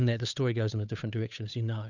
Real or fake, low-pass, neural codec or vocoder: fake; 7.2 kHz; codec, 16 kHz, 8 kbps, FunCodec, trained on Chinese and English, 25 frames a second